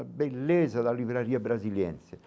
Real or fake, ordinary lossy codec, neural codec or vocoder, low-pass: real; none; none; none